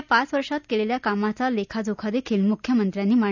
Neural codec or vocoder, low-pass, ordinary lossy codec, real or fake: none; 7.2 kHz; none; real